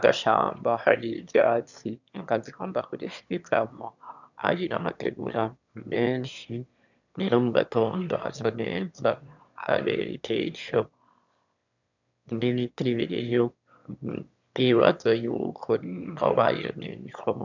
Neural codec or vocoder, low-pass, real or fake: autoencoder, 22.05 kHz, a latent of 192 numbers a frame, VITS, trained on one speaker; 7.2 kHz; fake